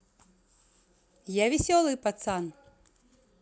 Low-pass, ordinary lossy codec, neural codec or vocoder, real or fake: none; none; none; real